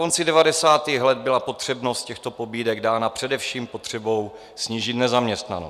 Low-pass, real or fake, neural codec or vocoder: 14.4 kHz; real; none